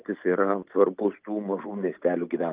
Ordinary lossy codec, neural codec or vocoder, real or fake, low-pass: Opus, 32 kbps; none; real; 3.6 kHz